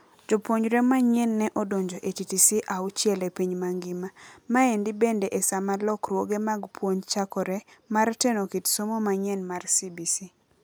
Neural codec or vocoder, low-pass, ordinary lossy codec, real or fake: none; none; none; real